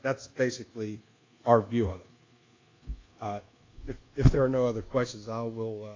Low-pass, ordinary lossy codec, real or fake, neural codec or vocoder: 7.2 kHz; AAC, 32 kbps; fake; codec, 24 kHz, 1.2 kbps, DualCodec